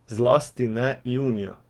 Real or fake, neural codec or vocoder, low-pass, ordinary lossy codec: fake; codec, 44.1 kHz, 2.6 kbps, DAC; 19.8 kHz; Opus, 32 kbps